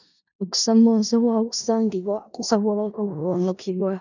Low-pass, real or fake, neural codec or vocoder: 7.2 kHz; fake; codec, 16 kHz in and 24 kHz out, 0.4 kbps, LongCat-Audio-Codec, four codebook decoder